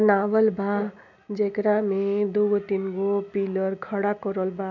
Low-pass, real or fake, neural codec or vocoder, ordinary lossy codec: 7.2 kHz; real; none; none